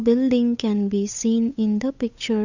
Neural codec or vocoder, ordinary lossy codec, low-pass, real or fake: codec, 16 kHz, 8 kbps, FunCodec, trained on Chinese and English, 25 frames a second; none; 7.2 kHz; fake